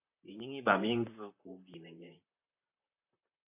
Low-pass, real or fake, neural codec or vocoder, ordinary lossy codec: 3.6 kHz; fake; vocoder, 44.1 kHz, 128 mel bands, Pupu-Vocoder; AAC, 32 kbps